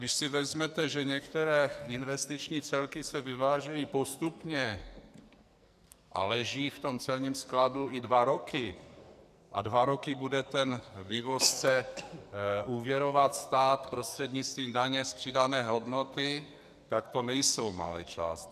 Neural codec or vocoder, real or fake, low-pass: codec, 44.1 kHz, 2.6 kbps, SNAC; fake; 14.4 kHz